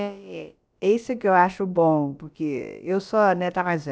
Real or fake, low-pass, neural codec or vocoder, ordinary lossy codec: fake; none; codec, 16 kHz, about 1 kbps, DyCAST, with the encoder's durations; none